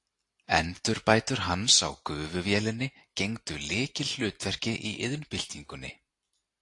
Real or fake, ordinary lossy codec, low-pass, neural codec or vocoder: real; AAC, 48 kbps; 10.8 kHz; none